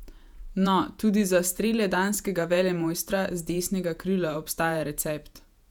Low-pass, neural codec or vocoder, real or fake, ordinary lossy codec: 19.8 kHz; vocoder, 48 kHz, 128 mel bands, Vocos; fake; none